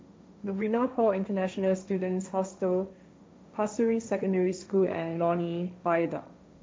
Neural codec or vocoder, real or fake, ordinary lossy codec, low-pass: codec, 16 kHz, 1.1 kbps, Voila-Tokenizer; fake; none; none